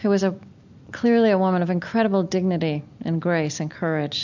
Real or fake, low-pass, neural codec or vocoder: real; 7.2 kHz; none